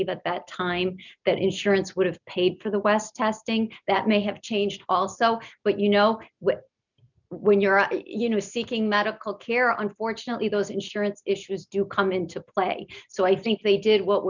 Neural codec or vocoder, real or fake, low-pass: none; real; 7.2 kHz